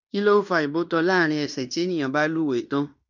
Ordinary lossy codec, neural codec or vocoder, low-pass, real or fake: none; codec, 16 kHz in and 24 kHz out, 0.9 kbps, LongCat-Audio-Codec, fine tuned four codebook decoder; 7.2 kHz; fake